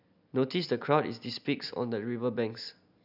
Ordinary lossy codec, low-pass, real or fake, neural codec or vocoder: none; 5.4 kHz; real; none